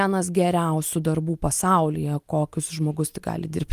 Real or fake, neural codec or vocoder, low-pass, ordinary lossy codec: real; none; 14.4 kHz; Opus, 32 kbps